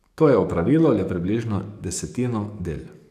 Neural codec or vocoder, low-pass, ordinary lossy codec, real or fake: codec, 44.1 kHz, 7.8 kbps, DAC; 14.4 kHz; none; fake